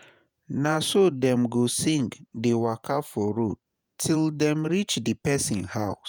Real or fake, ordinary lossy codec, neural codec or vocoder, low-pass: fake; none; vocoder, 48 kHz, 128 mel bands, Vocos; none